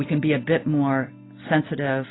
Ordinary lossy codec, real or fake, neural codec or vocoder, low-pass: AAC, 16 kbps; real; none; 7.2 kHz